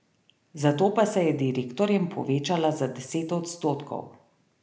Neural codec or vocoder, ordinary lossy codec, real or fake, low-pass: none; none; real; none